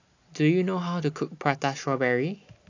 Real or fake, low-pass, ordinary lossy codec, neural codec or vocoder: real; 7.2 kHz; AAC, 48 kbps; none